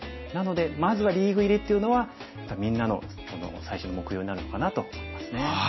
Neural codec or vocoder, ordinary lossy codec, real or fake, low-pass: none; MP3, 24 kbps; real; 7.2 kHz